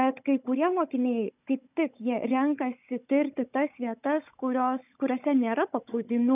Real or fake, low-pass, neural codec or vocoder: fake; 3.6 kHz; codec, 16 kHz, 16 kbps, FunCodec, trained on Chinese and English, 50 frames a second